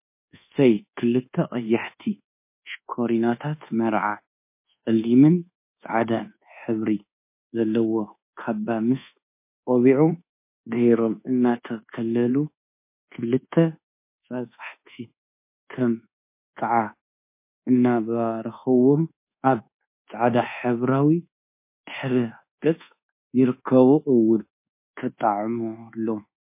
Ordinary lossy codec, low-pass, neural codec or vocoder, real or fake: MP3, 24 kbps; 3.6 kHz; codec, 24 kHz, 1.2 kbps, DualCodec; fake